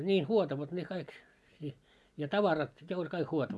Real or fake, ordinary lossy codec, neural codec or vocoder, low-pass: real; none; none; none